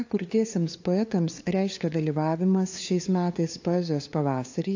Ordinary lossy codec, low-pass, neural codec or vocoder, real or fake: AAC, 48 kbps; 7.2 kHz; codec, 16 kHz, 2 kbps, FunCodec, trained on LibriTTS, 25 frames a second; fake